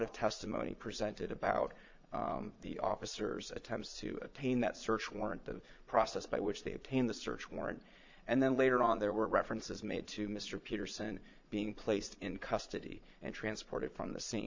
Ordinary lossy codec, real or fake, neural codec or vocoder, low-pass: MP3, 64 kbps; fake; vocoder, 22.05 kHz, 80 mel bands, Vocos; 7.2 kHz